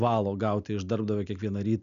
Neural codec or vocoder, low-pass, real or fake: none; 7.2 kHz; real